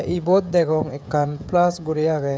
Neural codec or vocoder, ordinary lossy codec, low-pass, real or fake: codec, 16 kHz, 16 kbps, FreqCodec, smaller model; none; none; fake